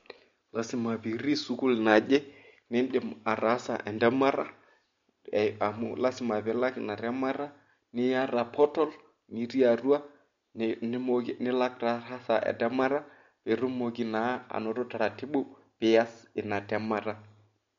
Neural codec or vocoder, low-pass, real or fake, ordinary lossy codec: none; 7.2 kHz; real; MP3, 48 kbps